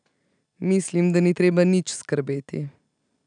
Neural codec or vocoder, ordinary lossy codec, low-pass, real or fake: none; none; 9.9 kHz; real